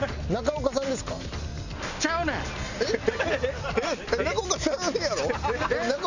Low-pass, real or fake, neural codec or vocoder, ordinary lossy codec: 7.2 kHz; real; none; none